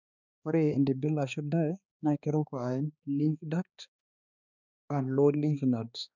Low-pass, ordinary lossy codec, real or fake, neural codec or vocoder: 7.2 kHz; none; fake; codec, 16 kHz, 2 kbps, X-Codec, HuBERT features, trained on balanced general audio